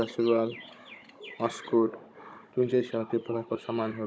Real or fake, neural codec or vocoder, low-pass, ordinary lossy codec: fake; codec, 16 kHz, 16 kbps, FunCodec, trained on Chinese and English, 50 frames a second; none; none